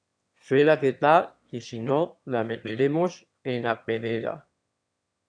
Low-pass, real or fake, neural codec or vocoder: 9.9 kHz; fake; autoencoder, 22.05 kHz, a latent of 192 numbers a frame, VITS, trained on one speaker